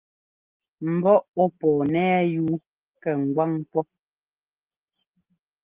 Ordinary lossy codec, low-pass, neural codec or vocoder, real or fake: Opus, 32 kbps; 3.6 kHz; none; real